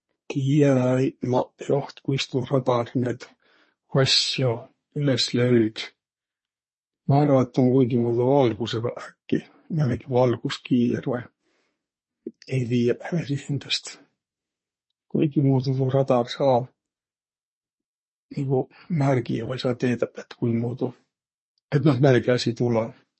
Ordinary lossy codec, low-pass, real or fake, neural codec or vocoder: MP3, 32 kbps; 10.8 kHz; fake; codec, 24 kHz, 1 kbps, SNAC